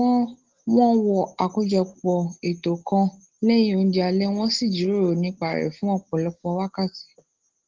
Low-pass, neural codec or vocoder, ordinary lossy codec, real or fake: 7.2 kHz; none; Opus, 16 kbps; real